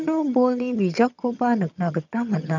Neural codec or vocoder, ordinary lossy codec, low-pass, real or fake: vocoder, 22.05 kHz, 80 mel bands, HiFi-GAN; none; 7.2 kHz; fake